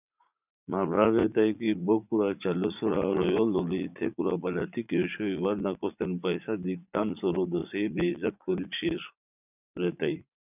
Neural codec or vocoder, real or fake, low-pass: vocoder, 44.1 kHz, 80 mel bands, Vocos; fake; 3.6 kHz